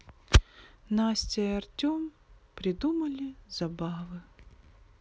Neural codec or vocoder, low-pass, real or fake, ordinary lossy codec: none; none; real; none